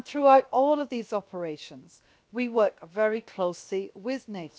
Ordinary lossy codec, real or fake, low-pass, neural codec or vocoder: none; fake; none; codec, 16 kHz, 0.7 kbps, FocalCodec